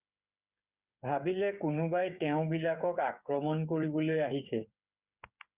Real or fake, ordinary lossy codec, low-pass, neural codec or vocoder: fake; Opus, 64 kbps; 3.6 kHz; codec, 16 kHz, 8 kbps, FreqCodec, smaller model